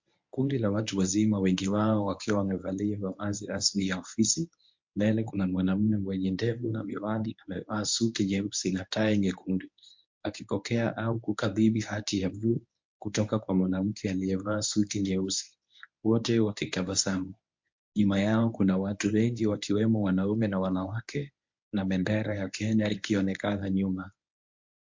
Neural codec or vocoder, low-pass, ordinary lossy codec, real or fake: codec, 24 kHz, 0.9 kbps, WavTokenizer, medium speech release version 1; 7.2 kHz; MP3, 48 kbps; fake